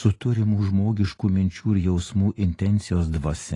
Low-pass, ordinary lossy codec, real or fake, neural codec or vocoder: 10.8 kHz; AAC, 32 kbps; real; none